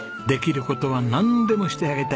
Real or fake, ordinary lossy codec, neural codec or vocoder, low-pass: real; none; none; none